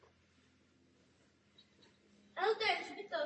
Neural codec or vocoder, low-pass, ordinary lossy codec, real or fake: none; 9.9 kHz; MP3, 32 kbps; real